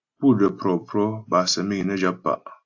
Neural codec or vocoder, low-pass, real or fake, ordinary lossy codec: none; 7.2 kHz; real; AAC, 48 kbps